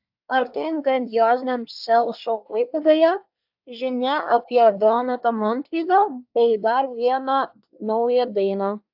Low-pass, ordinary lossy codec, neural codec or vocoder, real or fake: 5.4 kHz; AAC, 48 kbps; codec, 24 kHz, 1 kbps, SNAC; fake